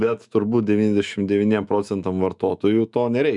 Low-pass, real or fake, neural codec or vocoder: 10.8 kHz; fake; autoencoder, 48 kHz, 128 numbers a frame, DAC-VAE, trained on Japanese speech